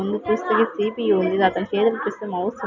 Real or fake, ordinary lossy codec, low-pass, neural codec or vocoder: real; none; 7.2 kHz; none